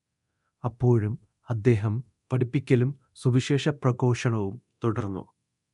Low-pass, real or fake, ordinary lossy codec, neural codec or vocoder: 10.8 kHz; fake; MP3, 64 kbps; codec, 24 kHz, 0.9 kbps, DualCodec